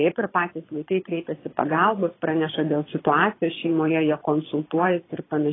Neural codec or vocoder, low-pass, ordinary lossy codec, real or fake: vocoder, 22.05 kHz, 80 mel bands, Vocos; 7.2 kHz; AAC, 16 kbps; fake